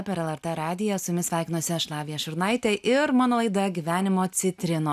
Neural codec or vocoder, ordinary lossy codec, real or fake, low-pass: none; AAC, 96 kbps; real; 14.4 kHz